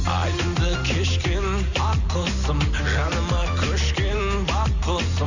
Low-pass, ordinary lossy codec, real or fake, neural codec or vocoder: 7.2 kHz; none; real; none